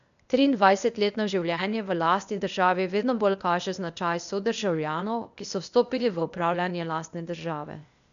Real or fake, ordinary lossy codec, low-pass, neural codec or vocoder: fake; none; 7.2 kHz; codec, 16 kHz, 0.8 kbps, ZipCodec